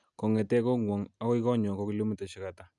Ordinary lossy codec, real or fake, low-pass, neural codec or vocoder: none; real; 10.8 kHz; none